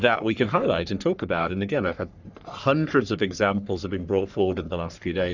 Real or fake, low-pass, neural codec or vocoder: fake; 7.2 kHz; codec, 44.1 kHz, 3.4 kbps, Pupu-Codec